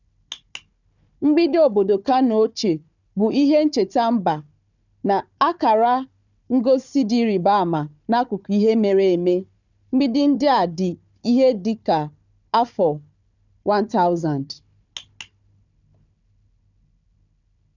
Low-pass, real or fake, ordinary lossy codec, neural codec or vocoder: 7.2 kHz; fake; none; codec, 16 kHz, 4 kbps, FunCodec, trained on Chinese and English, 50 frames a second